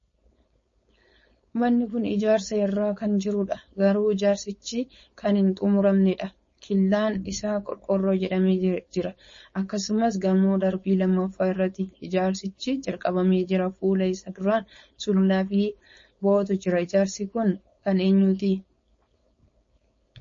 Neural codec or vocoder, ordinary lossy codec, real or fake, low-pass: codec, 16 kHz, 4.8 kbps, FACodec; MP3, 32 kbps; fake; 7.2 kHz